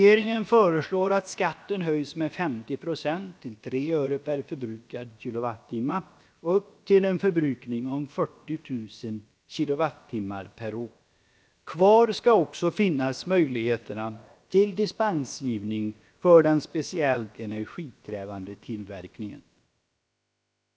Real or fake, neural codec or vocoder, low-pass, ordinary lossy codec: fake; codec, 16 kHz, about 1 kbps, DyCAST, with the encoder's durations; none; none